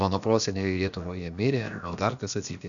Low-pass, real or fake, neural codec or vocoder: 7.2 kHz; fake; codec, 16 kHz, about 1 kbps, DyCAST, with the encoder's durations